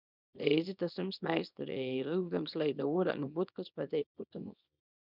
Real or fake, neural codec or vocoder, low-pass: fake; codec, 24 kHz, 0.9 kbps, WavTokenizer, small release; 5.4 kHz